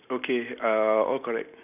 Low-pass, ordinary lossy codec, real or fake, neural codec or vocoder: 3.6 kHz; none; real; none